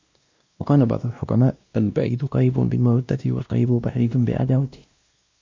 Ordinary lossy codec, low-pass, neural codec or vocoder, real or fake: MP3, 64 kbps; 7.2 kHz; codec, 16 kHz, 1 kbps, X-Codec, WavLM features, trained on Multilingual LibriSpeech; fake